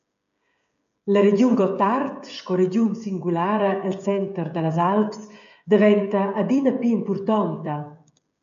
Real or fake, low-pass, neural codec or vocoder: fake; 7.2 kHz; codec, 16 kHz, 16 kbps, FreqCodec, smaller model